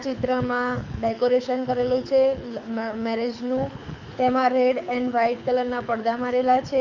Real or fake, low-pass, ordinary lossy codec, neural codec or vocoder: fake; 7.2 kHz; none; codec, 24 kHz, 6 kbps, HILCodec